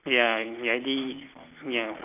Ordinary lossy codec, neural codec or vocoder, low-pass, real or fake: none; none; 3.6 kHz; real